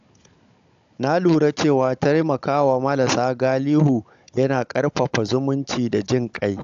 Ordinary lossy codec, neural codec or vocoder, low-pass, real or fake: AAC, 64 kbps; codec, 16 kHz, 16 kbps, FunCodec, trained on Chinese and English, 50 frames a second; 7.2 kHz; fake